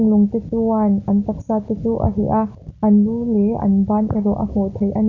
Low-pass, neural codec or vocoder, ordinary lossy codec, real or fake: 7.2 kHz; none; none; real